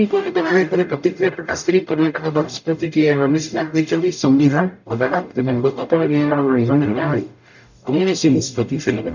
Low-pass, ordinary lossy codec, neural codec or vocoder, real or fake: 7.2 kHz; none; codec, 44.1 kHz, 0.9 kbps, DAC; fake